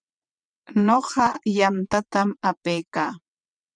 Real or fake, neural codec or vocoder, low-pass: fake; vocoder, 22.05 kHz, 80 mel bands, WaveNeXt; 9.9 kHz